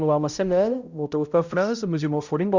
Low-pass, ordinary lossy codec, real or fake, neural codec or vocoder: 7.2 kHz; Opus, 64 kbps; fake; codec, 16 kHz, 0.5 kbps, X-Codec, HuBERT features, trained on balanced general audio